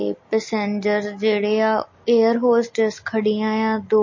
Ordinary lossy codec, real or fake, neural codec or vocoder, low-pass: MP3, 32 kbps; real; none; 7.2 kHz